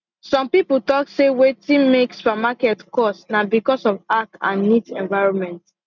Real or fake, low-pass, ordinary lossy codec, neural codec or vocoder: real; 7.2 kHz; none; none